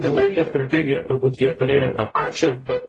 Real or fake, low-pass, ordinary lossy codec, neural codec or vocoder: fake; 10.8 kHz; AAC, 32 kbps; codec, 44.1 kHz, 0.9 kbps, DAC